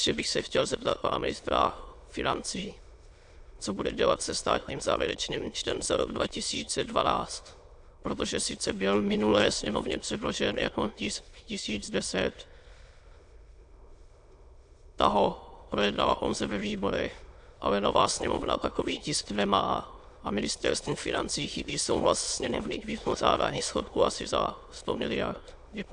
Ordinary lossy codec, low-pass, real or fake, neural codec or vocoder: AAC, 64 kbps; 9.9 kHz; fake; autoencoder, 22.05 kHz, a latent of 192 numbers a frame, VITS, trained on many speakers